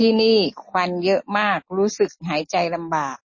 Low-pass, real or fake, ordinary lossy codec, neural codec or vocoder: 7.2 kHz; real; MP3, 32 kbps; none